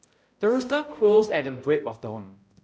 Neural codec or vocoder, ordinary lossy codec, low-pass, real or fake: codec, 16 kHz, 0.5 kbps, X-Codec, HuBERT features, trained on balanced general audio; none; none; fake